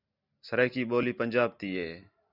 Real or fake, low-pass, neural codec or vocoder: real; 5.4 kHz; none